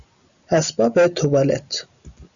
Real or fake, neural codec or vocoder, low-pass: real; none; 7.2 kHz